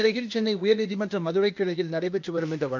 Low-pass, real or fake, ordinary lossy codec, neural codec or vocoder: 7.2 kHz; fake; MP3, 48 kbps; codec, 16 kHz, 0.8 kbps, ZipCodec